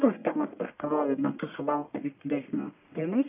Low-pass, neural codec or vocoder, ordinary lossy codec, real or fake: 3.6 kHz; codec, 44.1 kHz, 1.7 kbps, Pupu-Codec; AAC, 32 kbps; fake